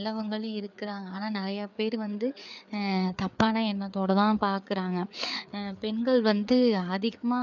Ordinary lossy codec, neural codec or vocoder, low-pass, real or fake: none; codec, 16 kHz, 4 kbps, FreqCodec, larger model; 7.2 kHz; fake